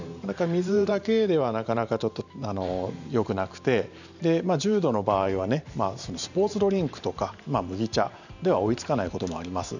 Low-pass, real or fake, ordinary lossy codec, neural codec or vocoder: 7.2 kHz; fake; none; vocoder, 44.1 kHz, 128 mel bands every 512 samples, BigVGAN v2